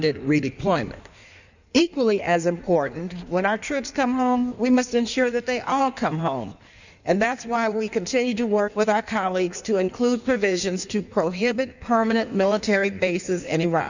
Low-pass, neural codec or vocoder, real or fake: 7.2 kHz; codec, 16 kHz in and 24 kHz out, 1.1 kbps, FireRedTTS-2 codec; fake